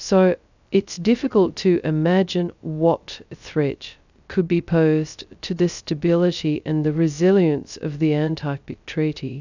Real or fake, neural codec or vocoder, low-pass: fake; codec, 16 kHz, 0.2 kbps, FocalCodec; 7.2 kHz